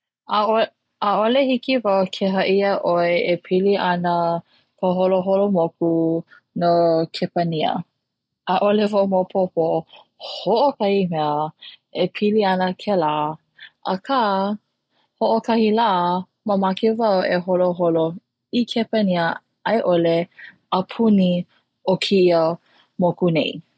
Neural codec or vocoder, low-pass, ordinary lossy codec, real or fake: none; none; none; real